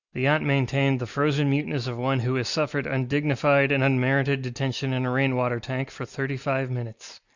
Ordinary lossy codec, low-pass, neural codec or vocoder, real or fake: Opus, 64 kbps; 7.2 kHz; none; real